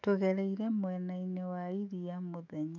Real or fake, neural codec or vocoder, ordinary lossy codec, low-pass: fake; autoencoder, 48 kHz, 128 numbers a frame, DAC-VAE, trained on Japanese speech; none; 7.2 kHz